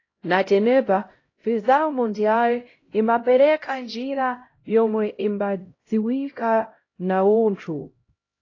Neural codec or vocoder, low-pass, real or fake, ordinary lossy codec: codec, 16 kHz, 0.5 kbps, X-Codec, HuBERT features, trained on LibriSpeech; 7.2 kHz; fake; AAC, 32 kbps